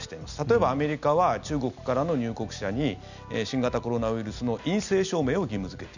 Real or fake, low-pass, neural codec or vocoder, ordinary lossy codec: real; 7.2 kHz; none; none